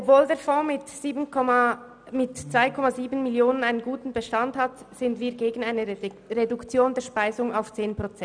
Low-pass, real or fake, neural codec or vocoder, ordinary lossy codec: 9.9 kHz; real; none; none